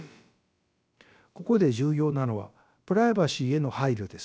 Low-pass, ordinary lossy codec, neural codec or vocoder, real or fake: none; none; codec, 16 kHz, about 1 kbps, DyCAST, with the encoder's durations; fake